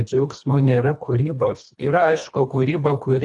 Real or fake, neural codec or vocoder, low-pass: fake; codec, 24 kHz, 1.5 kbps, HILCodec; 10.8 kHz